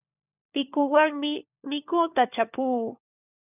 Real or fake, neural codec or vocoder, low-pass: fake; codec, 16 kHz, 4 kbps, FunCodec, trained on LibriTTS, 50 frames a second; 3.6 kHz